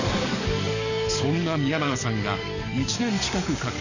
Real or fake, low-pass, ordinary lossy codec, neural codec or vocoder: fake; 7.2 kHz; none; codec, 44.1 kHz, 7.8 kbps, DAC